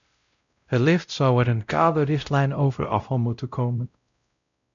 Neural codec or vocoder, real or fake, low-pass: codec, 16 kHz, 0.5 kbps, X-Codec, WavLM features, trained on Multilingual LibriSpeech; fake; 7.2 kHz